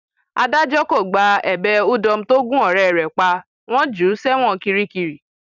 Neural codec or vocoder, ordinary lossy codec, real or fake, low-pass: vocoder, 44.1 kHz, 128 mel bands every 256 samples, BigVGAN v2; none; fake; 7.2 kHz